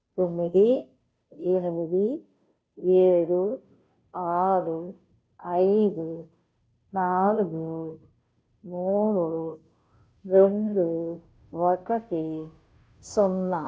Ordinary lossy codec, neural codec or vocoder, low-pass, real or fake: none; codec, 16 kHz, 0.5 kbps, FunCodec, trained on Chinese and English, 25 frames a second; none; fake